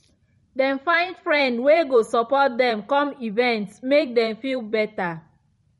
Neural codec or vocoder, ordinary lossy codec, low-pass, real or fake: vocoder, 44.1 kHz, 128 mel bands every 512 samples, BigVGAN v2; MP3, 48 kbps; 19.8 kHz; fake